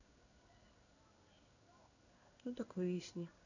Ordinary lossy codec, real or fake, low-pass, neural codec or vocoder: none; fake; 7.2 kHz; codec, 16 kHz in and 24 kHz out, 1 kbps, XY-Tokenizer